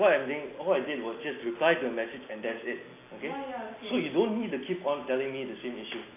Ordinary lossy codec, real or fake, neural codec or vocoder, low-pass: AAC, 24 kbps; real; none; 3.6 kHz